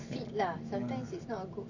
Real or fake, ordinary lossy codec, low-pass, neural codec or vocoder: real; none; 7.2 kHz; none